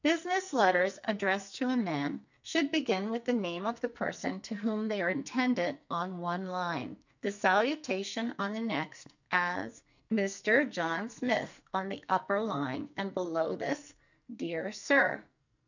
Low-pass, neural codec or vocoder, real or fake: 7.2 kHz; codec, 44.1 kHz, 2.6 kbps, SNAC; fake